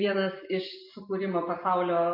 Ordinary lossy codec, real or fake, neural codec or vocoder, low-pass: MP3, 32 kbps; real; none; 5.4 kHz